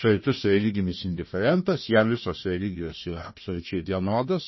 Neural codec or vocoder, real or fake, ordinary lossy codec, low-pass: codec, 16 kHz, 1 kbps, FunCodec, trained on Chinese and English, 50 frames a second; fake; MP3, 24 kbps; 7.2 kHz